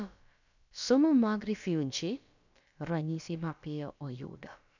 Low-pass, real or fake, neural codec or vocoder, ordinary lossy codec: 7.2 kHz; fake; codec, 16 kHz, about 1 kbps, DyCAST, with the encoder's durations; none